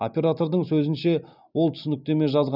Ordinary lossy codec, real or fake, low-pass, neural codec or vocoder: none; real; 5.4 kHz; none